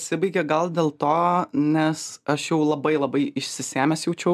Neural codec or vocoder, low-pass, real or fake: none; 14.4 kHz; real